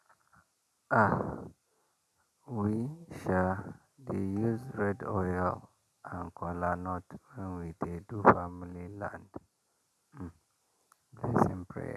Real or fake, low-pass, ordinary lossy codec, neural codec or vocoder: real; 14.4 kHz; none; none